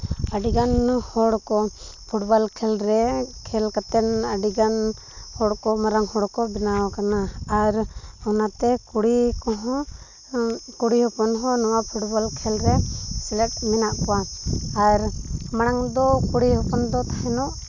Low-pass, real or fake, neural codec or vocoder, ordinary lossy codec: 7.2 kHz; real; none; none